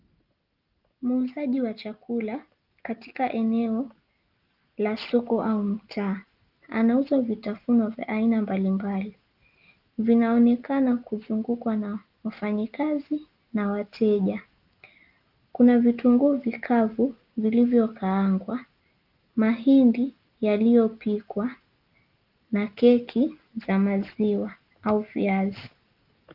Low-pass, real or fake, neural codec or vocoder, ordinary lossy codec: 5.4 kHz; real; none; Opus, 24 kbps